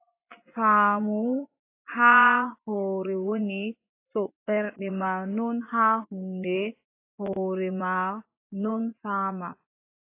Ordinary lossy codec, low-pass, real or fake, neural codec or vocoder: AAC, 24 kbps; 3.6 kHz; fake; vocoder, 24 kHz, 100 mel bands, Vocos